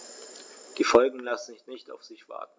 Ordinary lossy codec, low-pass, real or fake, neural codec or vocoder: none; none; real; none